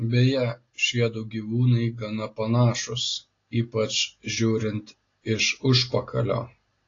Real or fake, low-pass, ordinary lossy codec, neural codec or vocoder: real; 7.2 kHz; AAC, 32 kbps; none